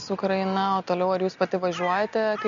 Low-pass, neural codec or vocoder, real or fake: 7.2 kHz; none; real